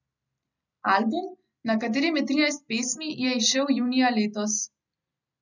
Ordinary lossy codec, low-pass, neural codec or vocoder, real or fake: none; 7.2 kHz; none; real